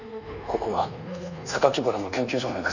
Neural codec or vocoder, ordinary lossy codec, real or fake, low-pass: codec, 24 kHz, 1.2 kbps, DualCodec; none; fake; 7.2 kHz